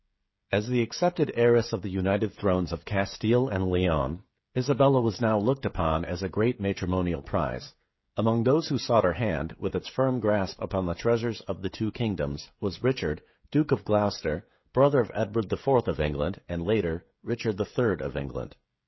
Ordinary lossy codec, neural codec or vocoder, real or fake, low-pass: MP3, 24 kbps; codec, 16 kHz, 16 kbps, FreqCodec, smaller model; fake; 7.2 kHz